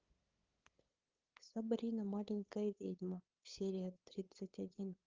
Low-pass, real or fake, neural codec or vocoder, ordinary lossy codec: 7.2 kHz; fake; codec, 16 kHz, 8 kbps, FunCodec, trained on LibriTTS, 25 frames a second; Opus, 32 kbps